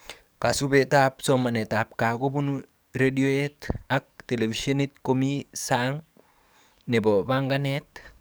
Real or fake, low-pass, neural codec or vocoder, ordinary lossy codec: fake; none; codec, 44.1 kHz, 7.8 kbps, DAC; none